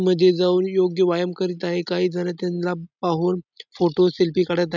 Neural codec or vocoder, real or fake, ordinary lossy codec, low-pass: none; real; none; 7.2 kHz